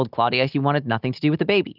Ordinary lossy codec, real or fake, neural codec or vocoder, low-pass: Opus, 24 kbps; real; none; 5.4 kHz